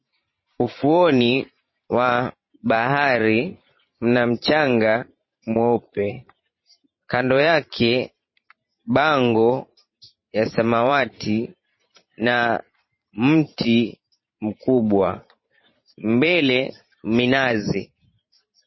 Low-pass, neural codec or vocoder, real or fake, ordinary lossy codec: 7.2 kHz; vocoder, 44.1 kHz, 128 mel bands every 512 samples, BigVGAN v2; fake; MP3, 24 kbps